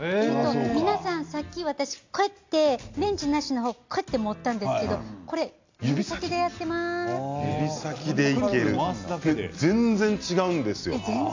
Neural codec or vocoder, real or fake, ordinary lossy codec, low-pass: none; real; AAC, 48 kbps; 7.2 kHz